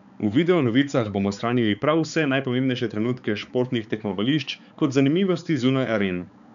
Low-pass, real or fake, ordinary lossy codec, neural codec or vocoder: 7.2 kHz; fake; none; codec, 16 kHz, 4 kbps, X-Codec, HuBERT features, trained on balanced general audio